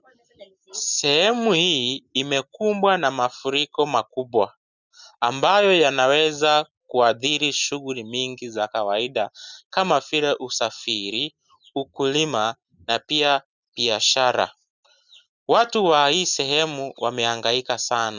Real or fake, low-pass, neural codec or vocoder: real; 7.2 kHz; none